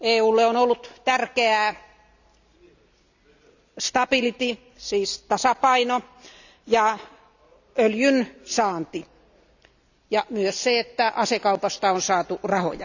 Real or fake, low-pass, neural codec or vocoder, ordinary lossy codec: real; 7.2 kHz; none; none